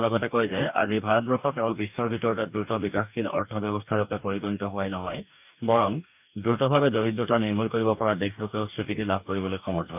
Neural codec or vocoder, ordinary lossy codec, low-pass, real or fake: codec, 44.1 kHz, 2.6 kbps, DAC; none; 3.6 kHz; fake